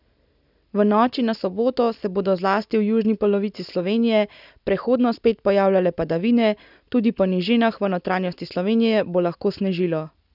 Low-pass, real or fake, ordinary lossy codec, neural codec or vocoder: 5.4 kHz; real; none; none